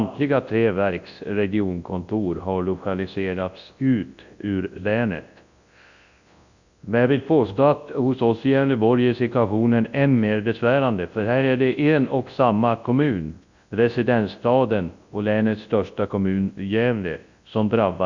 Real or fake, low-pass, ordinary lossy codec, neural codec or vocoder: fake; 7.2 kHz; Opus, 64 kbps; codec, 24 kHz, 0.9 kbps, WavTokenizer, large speech release